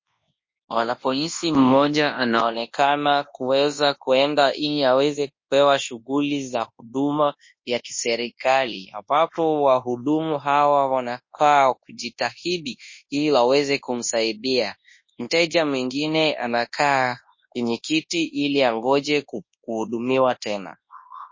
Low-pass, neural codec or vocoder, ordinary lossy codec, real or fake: 7.2 kHz; codec, 24 kHz, 0.9 kbps, WavTokenizer, large speech release; MP3, 32 kbps; fake